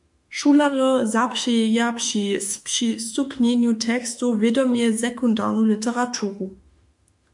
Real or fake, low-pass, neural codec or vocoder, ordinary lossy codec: fake; 10.8 kHz; autoencoder, 48 kHz, 32 numbers a frame, DAC-VAE, trained on Japanese speech; MP3, 64 kbps